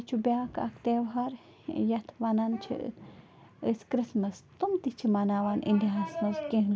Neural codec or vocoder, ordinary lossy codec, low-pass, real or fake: none; none; none; real